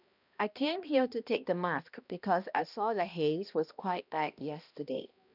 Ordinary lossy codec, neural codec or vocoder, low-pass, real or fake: none; codec, 16 kHz, 1 kbps, X-Codec, HuBERT features, trained on balanced general audio; 5.4 kHz; fake